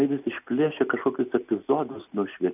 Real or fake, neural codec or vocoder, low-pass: real; none; 3.6 kHz